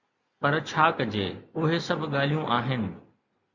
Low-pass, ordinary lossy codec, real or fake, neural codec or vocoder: 7.2 kHz; Opus, 64 kbps; real; none